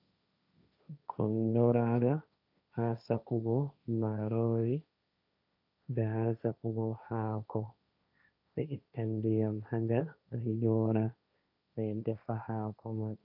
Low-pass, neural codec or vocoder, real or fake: 5.4 kHz; codec, 16 kHz, 1.1 kbps, Voila-Tokenizer; fake